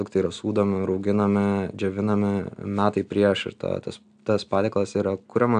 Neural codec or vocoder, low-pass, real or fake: none; 9.9 kHz; real